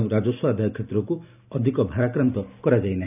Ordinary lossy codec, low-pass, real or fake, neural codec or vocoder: none; 3.6 kHz; real; none